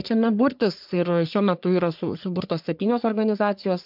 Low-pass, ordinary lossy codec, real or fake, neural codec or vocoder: 5.4 kHz; MP3, 48 kbps; fake; codec, 44.1 kHz, 3.4 kbps, Pupu-Codec